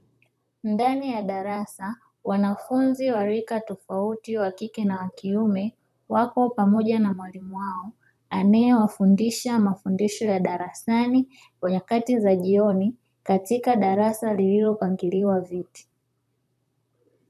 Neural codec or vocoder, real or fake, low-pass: vocoder, 44.1 kHz, 128 mel bands, Pupu-Vocoder; fake; 14.4 kHz